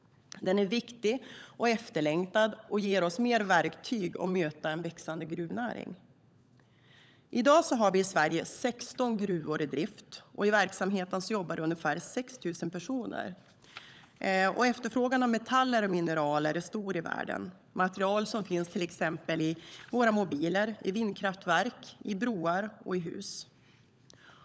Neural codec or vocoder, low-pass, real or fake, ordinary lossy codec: codec, 16 kHz, 16 kbps, FunCodec, trained on LibriTTS, 50 frames a second; none; fake; none